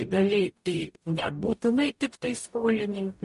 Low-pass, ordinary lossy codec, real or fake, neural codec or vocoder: 14.4 kHz; MP3, 48 kbps; fake; codec, 44.1 kHz, 0.9 kbps, DAC